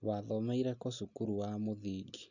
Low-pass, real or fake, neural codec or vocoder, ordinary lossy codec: 7.2 kHz; real; none; none